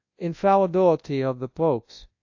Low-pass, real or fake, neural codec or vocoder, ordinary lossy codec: 7.2 kHz; fake; codec, 24 kHz, 0.9 kbps, WavTokenizer, large speech release; AAC, 48 kbps